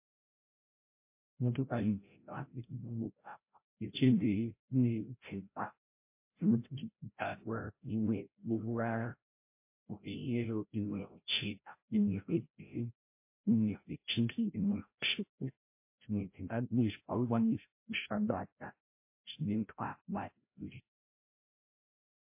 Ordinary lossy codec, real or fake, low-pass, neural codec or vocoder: MP3, 24 kbps; fake; 3.6 kHz; codec, 16 kHz, 0.5 kbps, FreqCodec, larger model